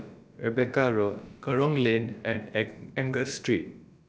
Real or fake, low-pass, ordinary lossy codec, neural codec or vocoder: fake; none; none; codec, 16 kHz, about 1 kbps, DyCAST, with the encoder's durations